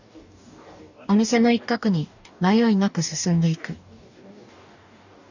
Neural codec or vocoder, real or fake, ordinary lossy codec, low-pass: codec, 44.1 kHz, 2.6 kbps, DAC; fake; none; 7.2 kHz